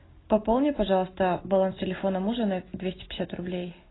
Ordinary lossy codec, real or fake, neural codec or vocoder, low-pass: AAC, 16 kbps; real; none; 7.2 kHz